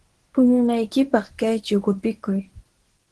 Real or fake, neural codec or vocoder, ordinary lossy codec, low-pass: fake; codec, 24 kHz, 0.9 kbps, WavTokenizer, medium speech release version 1; Opus, 16 kbps; 10.8 kHz